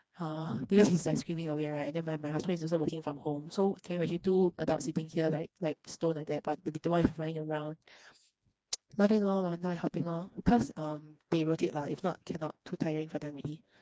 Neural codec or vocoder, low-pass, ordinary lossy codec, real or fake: codec, 16 kHz, 2 kbps, FreqCodec, smaller model; none; none; fake